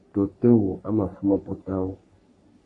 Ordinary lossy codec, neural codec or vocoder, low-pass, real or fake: AAC, 48 kbps; codec, 44.1 kHz, 3.4 kbps, Pupu-Codec; 10.8 kHz; fake